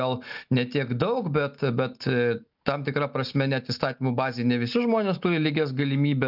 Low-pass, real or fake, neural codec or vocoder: 5.4 kHz; real; none